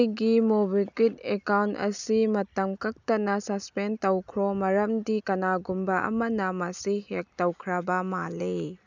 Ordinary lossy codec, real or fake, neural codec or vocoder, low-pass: none; real; none; 7.2 kHz